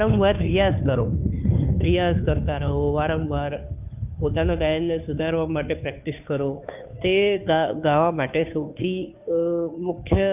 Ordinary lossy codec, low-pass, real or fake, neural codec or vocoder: none; 3.6 kHz; fake; codec, 16 kHz, 2 kbps, FunCodec, trained on Chinese and English, 25 frames a second